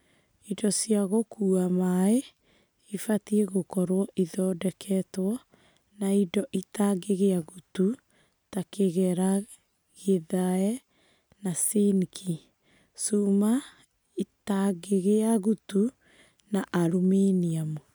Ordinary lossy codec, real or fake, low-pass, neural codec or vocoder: none; real; none; none